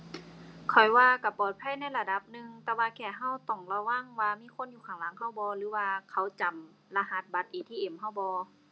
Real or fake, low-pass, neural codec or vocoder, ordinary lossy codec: real; none; none; none